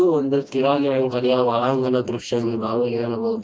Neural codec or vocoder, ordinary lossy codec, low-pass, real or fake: codec, 16 kHz, 1 kbps, FreqCodec, smaller model; none; none; fake